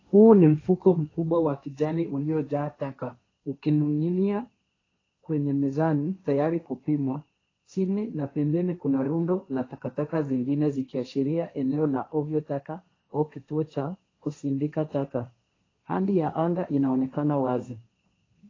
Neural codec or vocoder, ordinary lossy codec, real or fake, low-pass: codec, 16 kHz, 1.1 kbps, Voila-Tokenizer; AAC, 32 kbps; fake; 7.2 kHz